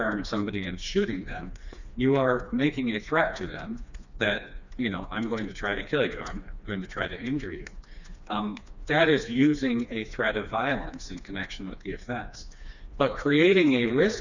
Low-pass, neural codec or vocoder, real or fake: 7.2 kHz; codec, 16 kHz, 2 kbps, FreqCodec, smaller model; fake